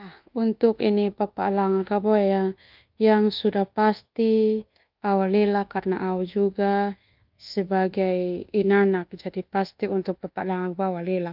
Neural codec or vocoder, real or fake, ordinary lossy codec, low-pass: codec, 24 kHz, 1.2 kbps, DualCodec; fake; Opus, 32 kbps; 5.4 kHz